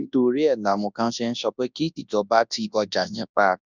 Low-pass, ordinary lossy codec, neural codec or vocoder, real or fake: 7.2 kHz; none; codec, 24 kHz, 0.9 kbps, WavTokenizer, large speech release; fake